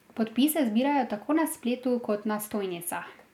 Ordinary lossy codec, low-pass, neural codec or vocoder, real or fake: none; 19.8 kHz; none; real